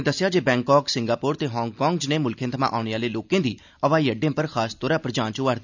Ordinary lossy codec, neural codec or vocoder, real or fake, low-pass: none; none; real; 7.2 kHz